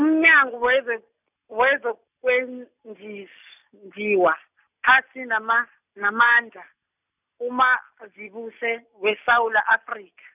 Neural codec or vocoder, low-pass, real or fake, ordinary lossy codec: none; 3.6 kHz; real; none